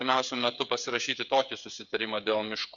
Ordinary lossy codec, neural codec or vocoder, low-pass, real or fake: MP3, 96 kbps; codec, 16 kHz, 8 kbps, FreqCodec, smaller model; 7.2 kHz; fake